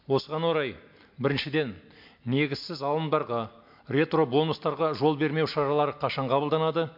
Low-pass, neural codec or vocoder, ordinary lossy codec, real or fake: 5.4 kHz; none; MP3, 48 kbps; real